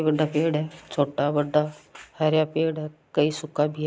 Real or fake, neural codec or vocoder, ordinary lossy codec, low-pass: real; none; none; none